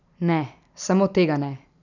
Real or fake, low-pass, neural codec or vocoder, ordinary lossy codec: real; 7.2 kHz; none; none